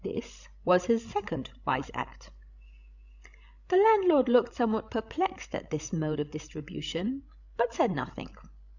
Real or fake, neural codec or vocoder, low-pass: fake; codec, 16 kHz, 16 kbps, FreqCodec, larger model; 7.2 kHz